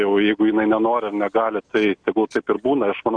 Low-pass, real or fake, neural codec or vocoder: 9.9 kHz; real; none